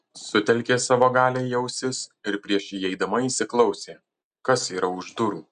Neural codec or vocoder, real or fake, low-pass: none; real; 9.9 kHz